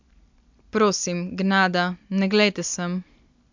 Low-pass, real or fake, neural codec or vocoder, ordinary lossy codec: 7.2 kHz; real; none; MP3, 64 kbps